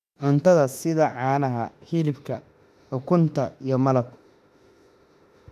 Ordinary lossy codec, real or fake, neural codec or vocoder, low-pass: none; fake; autoencoder, 48 kHz, 32 numbers a frame, DAC-VAE, trained on Japanese speech; 14.4 kHz